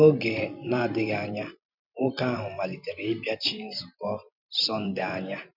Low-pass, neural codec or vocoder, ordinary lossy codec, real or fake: 5.4 kHz; vocoder, 44.1 kHz, 128 mel bands every 512 samples, BigVGAN v2; none; fake